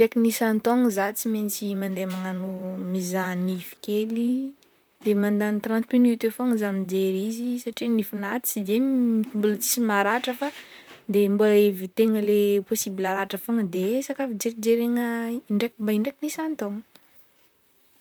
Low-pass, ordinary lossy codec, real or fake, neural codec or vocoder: none; none; fake; vocoder, 44.1 kHz, 128 mel bands, Pupu-Vocoder